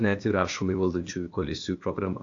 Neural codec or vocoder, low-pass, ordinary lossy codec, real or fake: codec, 16 kHz, 0.8 kbps, ZipCodec; 7.2 kHz; AAC, 48 kbps; fake